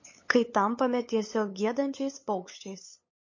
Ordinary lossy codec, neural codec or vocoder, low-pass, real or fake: MP3, 32 kbps; codec, 16 kHz, 8 kbps, FunCodec, trained on LibriTTS, 25 frames a second; 7.2 kHz; fake